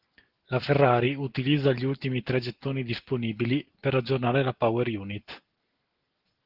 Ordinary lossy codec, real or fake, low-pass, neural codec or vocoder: Opus, 16 kbps; real; 5.4 kHz; none